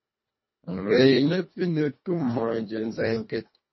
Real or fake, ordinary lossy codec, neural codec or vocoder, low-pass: fake; MP3, 24 kbps; codec, 24 kHz, 1.5 kbps, HILCodec; 7.2 kHz